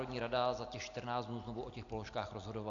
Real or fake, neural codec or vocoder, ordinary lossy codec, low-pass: real; none; AAC, 48 kbps; 7.2 kHz